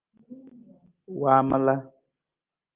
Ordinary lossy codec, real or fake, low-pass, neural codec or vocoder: Opus, 32 kbps; real; 3.6 kHz; none